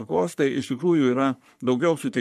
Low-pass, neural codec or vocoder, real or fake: 14.4 kHz; codec, 44.1 kHz, 3.4 kbps, Pupu-Codec; fake